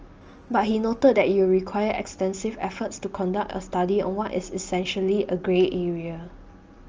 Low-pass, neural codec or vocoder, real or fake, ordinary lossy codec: 7.2 kHz; none; real; Opus, 24 kbps